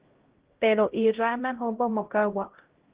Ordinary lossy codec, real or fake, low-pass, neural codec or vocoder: Opus, 16 kbps; fake; 3.6 kHz; codec, 16 kHz, 0.5 kbps, X-Codec, HuBERT features, trained on LibriSpeech